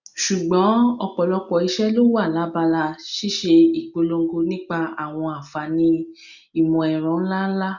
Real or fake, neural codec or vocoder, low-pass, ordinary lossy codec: real; none; 7.2 kHz; none